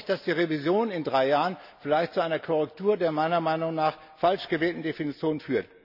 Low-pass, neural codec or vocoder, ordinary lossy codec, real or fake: 5.4 kHz; none; none; real